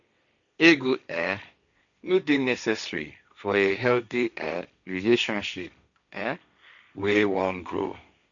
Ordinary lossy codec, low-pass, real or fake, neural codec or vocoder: none; 7.2 kHz; fake; codec, 16 kHz, 1.1 kbps, Voila-Tokenizer